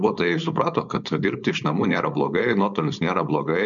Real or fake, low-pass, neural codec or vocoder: fake; 7.2 kHz; codec, 16 kHz, 4.8 kbps, FACodec